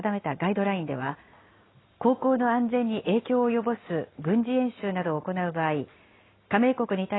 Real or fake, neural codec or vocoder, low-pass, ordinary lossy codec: real; none; 7.2 kHz; AAC, 16 kbps